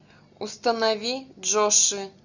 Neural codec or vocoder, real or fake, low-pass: none; real; 7.2 kHz